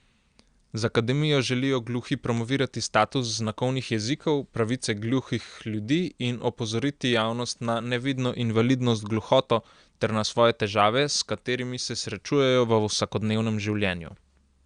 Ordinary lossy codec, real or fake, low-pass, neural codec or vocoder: Opus, 64 kbps; real; 9.9 kHz; none